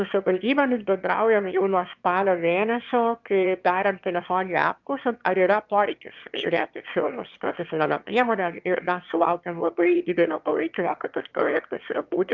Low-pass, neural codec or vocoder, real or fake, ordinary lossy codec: 7.2 kHz; autoencoder, 22.05 kHz, a latent of 192 numbers a frame, VITS, trained on one speaker; fake; Opus, 24 kbps